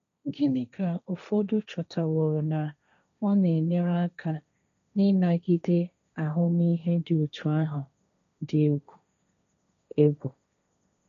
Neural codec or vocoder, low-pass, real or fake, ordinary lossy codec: codec, 16 kHz, 1.1 kbps, Voila-Tokenizer; 7.2 kHz; fake; none